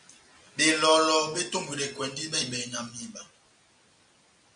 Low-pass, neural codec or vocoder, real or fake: 9.9 kHz; none; real